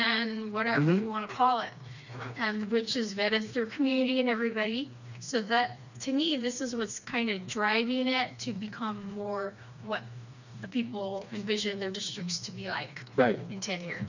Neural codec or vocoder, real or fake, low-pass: codec, 16 kHz, 2 kbps, FreqCodec, smaller model; fake; 7.2 kHz